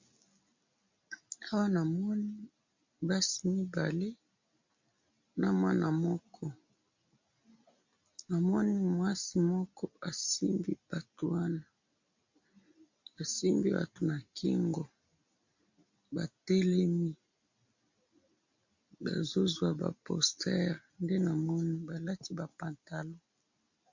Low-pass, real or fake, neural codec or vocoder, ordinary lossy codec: 7.2 kHz; real; none; MP3, 48 kbps